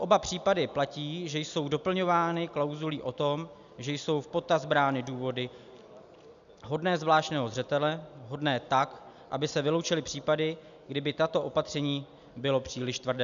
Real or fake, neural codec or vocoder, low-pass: real; none; 7.2 kHz